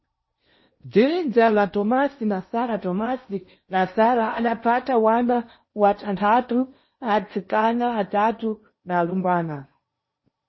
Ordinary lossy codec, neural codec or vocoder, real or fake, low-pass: MP3, 24 kbps; codec, 16 kHz in and 24 kHz out, 0.8 kbps, FocalCodec, streaming, 65536 codes; fake; 7.2 kHz